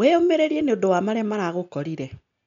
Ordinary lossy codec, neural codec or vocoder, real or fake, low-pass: none; none; real; 7.2 kHz